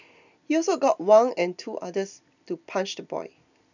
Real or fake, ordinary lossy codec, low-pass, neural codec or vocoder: real; none; 7.2 kHz; none